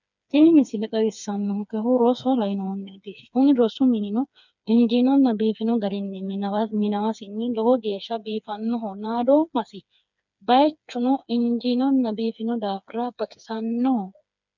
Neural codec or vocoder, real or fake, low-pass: codec, 16 kHz, 4 kbps, FreqCodec, smaller model; fake; 7.2 kHz